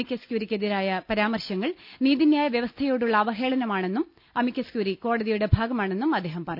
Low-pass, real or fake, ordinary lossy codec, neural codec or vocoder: 5.4 kHz; real; none; none